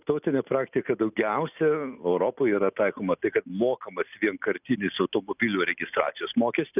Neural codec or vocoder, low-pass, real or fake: none; 3.6 kHz; real